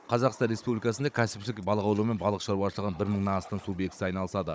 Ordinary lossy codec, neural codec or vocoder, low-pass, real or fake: none; codec, 16 kHz, 16 kbps, FunCodec, trained on Chinese and English, 50 frames a second; none; fake